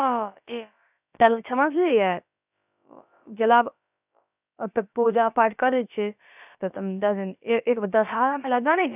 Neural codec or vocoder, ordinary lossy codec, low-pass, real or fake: codec, 16 kHz, about 1 kbps, DyCAST, with the encoder's durations; none; 3.6 kHz; fake